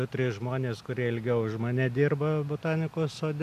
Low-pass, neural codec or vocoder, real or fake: 14.4 kHz; none; real